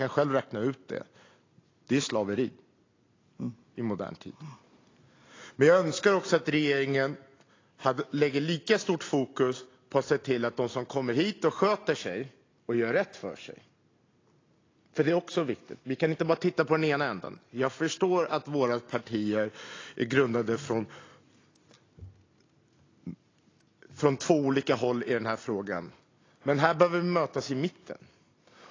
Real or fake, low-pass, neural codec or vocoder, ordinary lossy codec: real; 7.2 kHz; none; AAC, 32 kbps